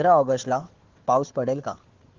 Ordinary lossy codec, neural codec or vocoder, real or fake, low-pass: Opus, 16 kbps; codec, 16 kHz, 4 kbps, FunCodec, trained on Chinese and English, 50 frames a second; fake; 7.2 kHz